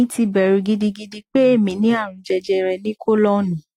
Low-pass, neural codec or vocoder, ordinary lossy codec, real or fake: 19.8 kHz; none; AAC, 48 kbps; real